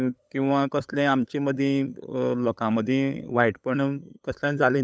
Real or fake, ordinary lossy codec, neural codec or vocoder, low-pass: fake; none; codec, 16 kHz, 8 kbps, FunCodec, trained on LibriTTS, 25 frames a second; none